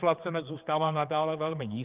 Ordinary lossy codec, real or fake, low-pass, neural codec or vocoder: Opus, 32 kbps; fake; 3.6 kHz; codec, 16 kHz, 4 kbps, X-Codec, HuBERT features, trained on general audio